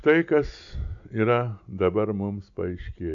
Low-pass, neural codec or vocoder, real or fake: 7.2 kHz; none; real